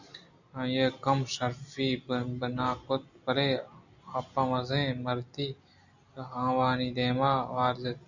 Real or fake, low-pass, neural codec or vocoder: real; 7.2 kHz; none